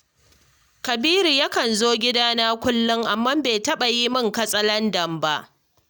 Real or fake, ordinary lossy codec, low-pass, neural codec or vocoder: real; none; none; none